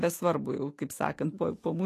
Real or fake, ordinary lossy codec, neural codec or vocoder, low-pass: fake; AAC, 48 kbps; autoencoder, 48 kHz, 128 numbers a frame, DAC-VAE, trained on Japanese speech; 14.4 kHz